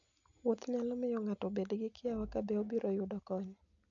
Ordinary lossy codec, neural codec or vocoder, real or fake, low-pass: none; none; real; 7.2 kHz